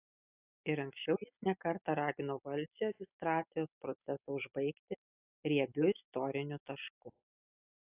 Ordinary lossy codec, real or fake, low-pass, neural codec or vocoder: AAC, 32 kbps; real; 3.6 kHz; none